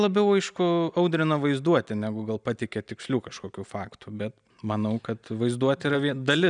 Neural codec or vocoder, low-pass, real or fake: none; 9.9 kHz; real